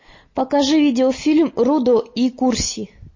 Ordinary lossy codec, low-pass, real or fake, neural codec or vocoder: MP3, 32 kbps; 7.2 kHz; real; none